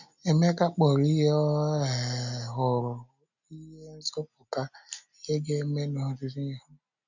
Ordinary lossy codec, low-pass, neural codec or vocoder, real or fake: none; 7.2 kHz; none; real